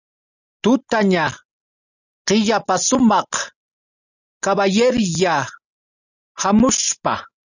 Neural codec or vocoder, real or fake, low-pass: none; real; 7.2 kHz